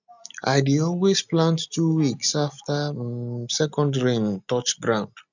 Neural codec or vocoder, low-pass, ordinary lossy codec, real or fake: none; 7.2 kHz; none; real